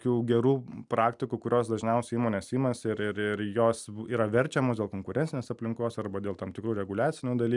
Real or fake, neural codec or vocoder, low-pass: real; none; 10.8 kHz